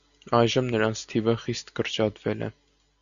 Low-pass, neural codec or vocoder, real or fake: 7.2 kHz; none; real